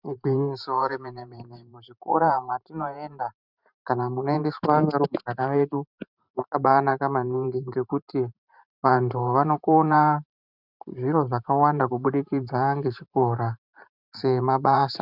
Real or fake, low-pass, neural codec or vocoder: fake; 5.4 kHz; vocoder, 44.1 kHz, 128 mel bands every 512 samples, BigVGAN v2